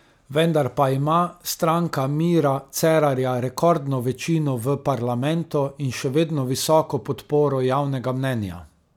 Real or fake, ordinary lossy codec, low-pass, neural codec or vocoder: real; none; 19.8 kHz; none